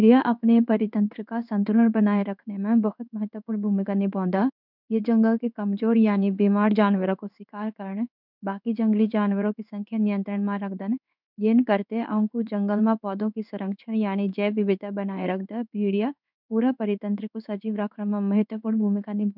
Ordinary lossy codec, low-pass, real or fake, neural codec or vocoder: none; 5.4 kHz; fake; codec, 16 kHz in and 24 kHz out, 1 kbps, XY-Tokenizer